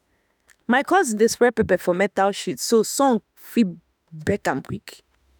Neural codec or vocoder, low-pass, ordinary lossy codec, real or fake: autoencoder, 48 kHz, 32 numbers a frame, DAC-VAE, trained on Japanese speech; none; none; fake